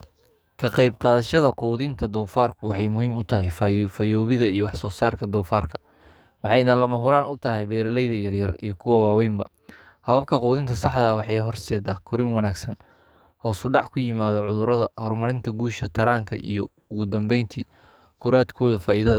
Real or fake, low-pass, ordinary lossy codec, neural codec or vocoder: fake; none; none; codec, 44.1 kHz, 2.6 kbps, SNAC